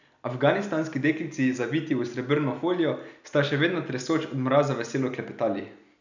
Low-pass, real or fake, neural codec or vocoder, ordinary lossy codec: 7.2 kHz; real; none; none